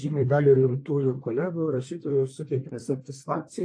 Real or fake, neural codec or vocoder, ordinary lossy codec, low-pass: fake; codec, 24 kHz, 1 kbps, SNAC; AAC, 48 kbps; 9.9 kHz